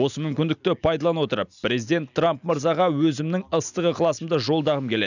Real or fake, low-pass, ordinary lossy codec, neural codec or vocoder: real; 7.2 kHz; none; none